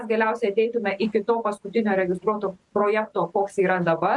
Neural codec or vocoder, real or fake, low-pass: none; real; 10.8 kHz